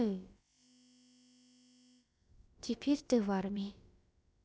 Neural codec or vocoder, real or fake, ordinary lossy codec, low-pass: codec, 16 kHz, about 1 kbps, DyCAST, with the encoder's durations; fake; none; none